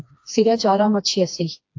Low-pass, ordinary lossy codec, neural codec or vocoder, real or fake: 7.2 kHz; AAC, 48 kbps; codec, 16 kHz, 2 kbps, FreqCodec, smaller model; fake